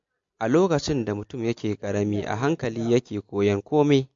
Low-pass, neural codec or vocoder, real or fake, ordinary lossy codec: 7.2 kHz; none; real; MP3, 48 kbps